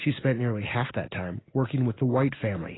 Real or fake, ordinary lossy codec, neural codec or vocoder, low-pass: real; AAC, 16 kbps; none; 7.2 kHz